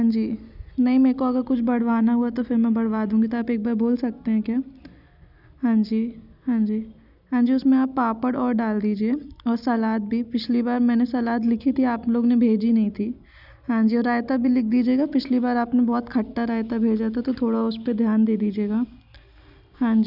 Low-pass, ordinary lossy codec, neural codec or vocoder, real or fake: 5.4 kHz; none; none; real